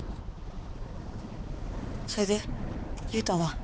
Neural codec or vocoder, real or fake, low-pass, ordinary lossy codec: codec, 16 kHz, 4 kbps, X-Codec, HuBERT features, trained on balanced general audio; fake; none; none